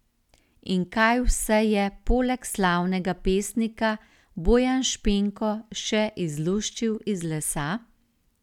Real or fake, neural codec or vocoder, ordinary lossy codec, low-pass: real; none; none; 19.8 kHz